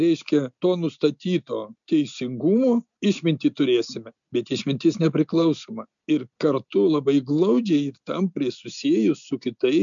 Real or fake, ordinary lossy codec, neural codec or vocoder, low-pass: real; MP3, 96 kbps; none; 7.2 kHz